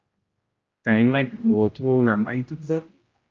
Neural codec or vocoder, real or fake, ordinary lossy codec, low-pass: codec, 16 kHz, 0.5 kbps, X-Codec, HuBERT features, trained on general audio; fake; Opus, 24 kbps; 7.2 kHz